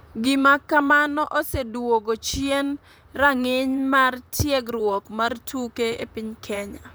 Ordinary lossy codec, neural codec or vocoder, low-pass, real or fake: none; vocoder, 44.1 kHz, 128 mel bands, Pupu-Vocoder; none; fake